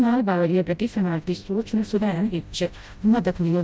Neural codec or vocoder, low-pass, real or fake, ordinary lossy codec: codec, 16 kHz, 0.5 kbps, FreqCodec, smaller model; none; fake; none